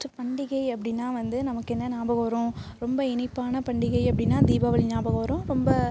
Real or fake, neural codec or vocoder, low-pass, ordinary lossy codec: real; none; none; none